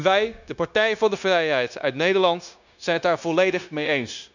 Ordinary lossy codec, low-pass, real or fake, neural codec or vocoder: none; 7.2 kHz; fake; codec, 16 kHz, 0.9 kbps, LongCat-Audio-Codec